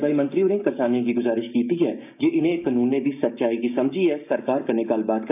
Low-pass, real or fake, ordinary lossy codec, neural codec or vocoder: 3.6 kHz; fake; none; codec, 16 kHz, 6 kbps, DAC